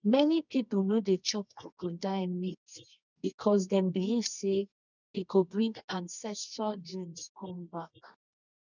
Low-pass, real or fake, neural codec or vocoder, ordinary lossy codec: 7.2 kHz; fake; codec, 24 kHz, 0.9 kbps, WavTokenizer, medium music audio release; none